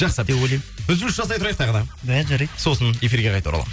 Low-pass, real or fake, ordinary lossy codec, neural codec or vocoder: none; real; none; none